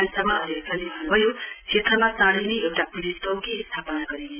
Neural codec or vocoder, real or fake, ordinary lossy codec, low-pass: none; real; none; 3.6 kHz